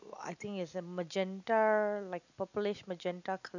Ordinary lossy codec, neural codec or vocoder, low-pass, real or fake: none; none; 7.2 kHz; real